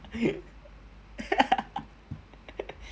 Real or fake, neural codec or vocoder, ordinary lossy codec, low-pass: real; none; none; none